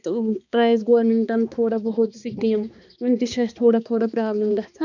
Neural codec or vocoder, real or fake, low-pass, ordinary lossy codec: codec, 16 kHz, 2 kbps, X-Codec, HuBERT features, trained on balanced general audio; fake; 7.2 kHz; none